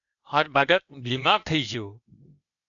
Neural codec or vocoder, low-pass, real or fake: codec, 16 kHz, 0.8 kbps, ZipCodec; 7.2 kHz; fake